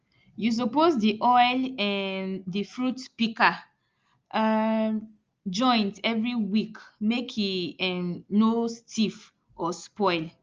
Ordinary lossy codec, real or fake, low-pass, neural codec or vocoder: Opus, 24 kbps; real; 7.2 kHz; none